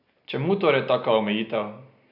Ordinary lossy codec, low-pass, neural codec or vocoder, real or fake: none; 5.4 kHz; none; real